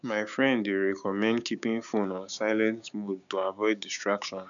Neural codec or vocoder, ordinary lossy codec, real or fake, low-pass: codec, 16 kHz, 6 kbps, DAC; none; fake; 7.2 kHz